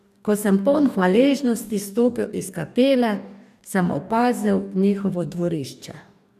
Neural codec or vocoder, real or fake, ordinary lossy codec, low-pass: codec, 44.1 kHz, 2.6 kbps, DAC; fake; none; 14.4 kHz